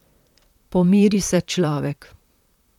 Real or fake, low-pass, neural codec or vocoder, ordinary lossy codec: fake; 19.8 kHz; vocoder, 44.1 kHz, 128 mel bands, Pupu-Vocoder; none